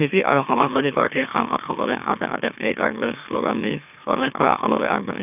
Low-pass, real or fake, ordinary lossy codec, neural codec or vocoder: 3.6 kHz; fake; none; autoencoder, 44.1 kHz, a latent of 192 numbers a frame, MeloTTS